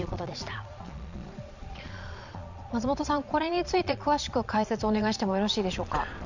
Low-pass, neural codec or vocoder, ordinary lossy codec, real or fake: 7.2 kHz; vocoder, 22.05 kHz, 80 mel bands, Vocos; none; fake